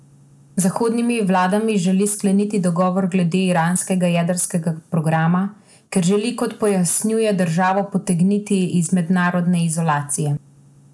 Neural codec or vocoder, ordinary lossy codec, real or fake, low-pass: none; none; real; none